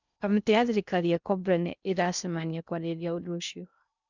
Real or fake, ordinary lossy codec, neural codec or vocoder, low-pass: fake; none; codec, 16 kHz in and 24 kHz out, 0.6 kbps, FocalCodec, streaming, 2048 codes; 7.2 kHz